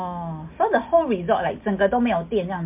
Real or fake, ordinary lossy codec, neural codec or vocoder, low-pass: real; MP3, 32 kbps; none; 3.6 kHz